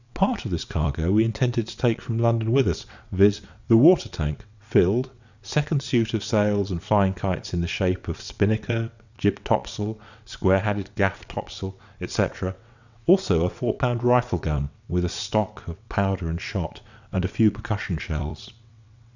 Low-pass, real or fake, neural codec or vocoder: 7.2 kHz; fake; vocoder, 22.05 kHz, 80 mel bands, WaveNeXt